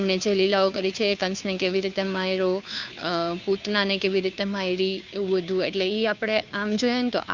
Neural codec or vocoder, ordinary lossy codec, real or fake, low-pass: codec, 16 kHz, 2 kbps, FunCodec, trained on Chinese and English, 25 frames a second; Opus, 64 kbps; fake; 7.2 kHz